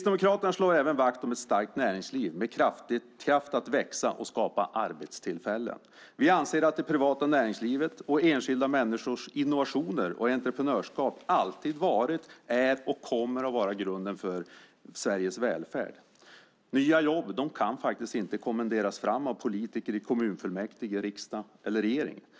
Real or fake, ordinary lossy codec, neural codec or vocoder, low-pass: real; none; none; none